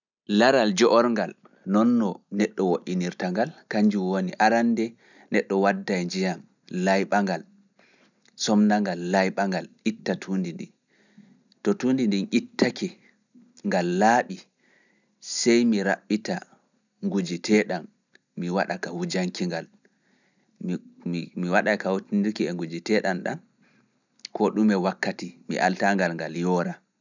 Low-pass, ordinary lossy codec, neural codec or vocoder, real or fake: 7.2 kHz; none; none; real